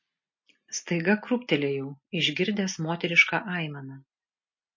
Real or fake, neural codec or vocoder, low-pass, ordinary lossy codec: real; none; 7.2 kHz; MP3, 32 kbps